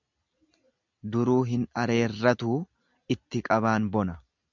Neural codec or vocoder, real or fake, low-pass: none; real; 7.2 kHz